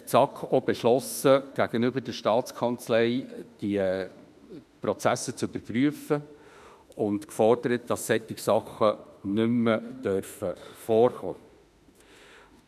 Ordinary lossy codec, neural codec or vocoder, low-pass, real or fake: none; autoencoder, 48 kHz, 32 numbers a frame, DAC-VAE, trained on Japanese speech; 14.4 kHz; fake